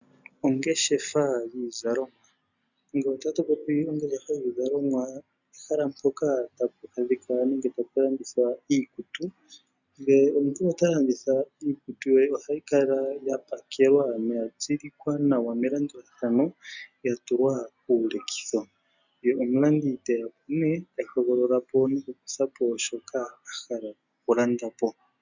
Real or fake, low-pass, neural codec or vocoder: real; 7.2 kHz; none